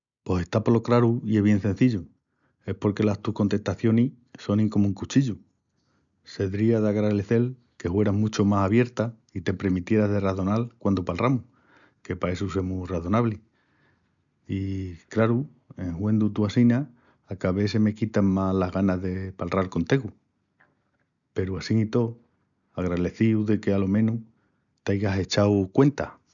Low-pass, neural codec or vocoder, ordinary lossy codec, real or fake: 7.2 kHz; none; none; real